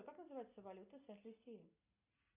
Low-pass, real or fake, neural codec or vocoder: 3.6 kHz; real; none